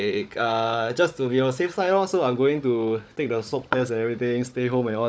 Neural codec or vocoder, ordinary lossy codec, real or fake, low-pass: codec, 16 kHz, 16 kbps, FunCodec, trained on Chinese and English, 50 frames a second; none; fake; none